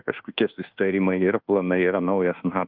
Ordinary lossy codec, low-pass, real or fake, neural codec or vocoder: Opus, 32 kbps; 5.4 kHz; fake; codec, 24 kHz, 1.2 kbps, DualCodec